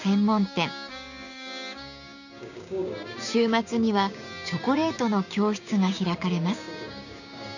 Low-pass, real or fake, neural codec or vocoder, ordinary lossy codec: 7.2 kHz; fake; codec, 16 kHz, 6 kbps, DAC; none